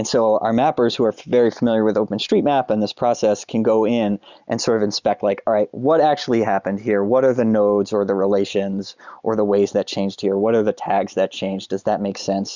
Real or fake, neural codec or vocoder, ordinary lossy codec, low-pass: real; none; Opus, 64 kbps; 7.2 kHz